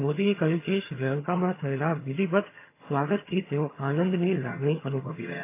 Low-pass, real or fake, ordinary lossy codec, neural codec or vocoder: 3.6 kHz; fake; AAC, 24 kbps; vocoder, 22.05 kHz, 80 mel bands, HiFi-GAN